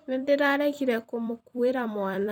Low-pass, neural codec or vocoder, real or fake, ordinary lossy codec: 19.8 kHz; vocoder, 44.1 kHz, 128 mel bands every 256 samples, BigVGAN v2; fake; none